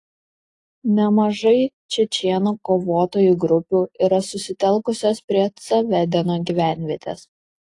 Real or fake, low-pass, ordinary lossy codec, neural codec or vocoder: real; 10.8 kHz; AAC, 48 kbps; none